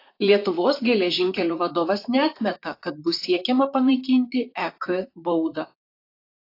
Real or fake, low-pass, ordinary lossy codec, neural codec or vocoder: fake; 5.4 kHz; AAC, 32 kbps; vocoder, 44.1 kHz, 128 mel bands, Pupu-Vocoder